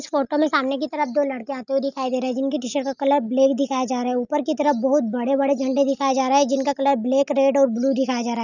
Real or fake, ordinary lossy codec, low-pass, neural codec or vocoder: real; none; 7.2 kHz; none